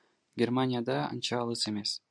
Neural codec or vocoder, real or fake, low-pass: none; real; 9.9 kHz